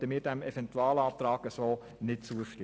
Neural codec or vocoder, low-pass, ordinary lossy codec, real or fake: none; none; none; real